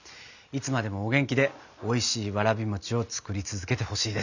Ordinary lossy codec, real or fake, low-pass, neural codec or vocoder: none; real; 7.2 kHz; none